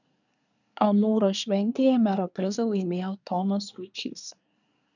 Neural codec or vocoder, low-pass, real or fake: codec, 24 kHz, 1 kbps, SNAC; 7.2 kHz; fake